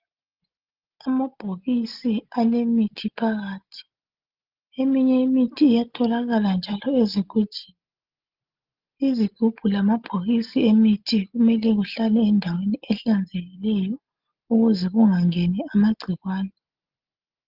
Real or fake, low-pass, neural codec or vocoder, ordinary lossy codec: real; 5.4 kHz; none; Opus, 32 kbps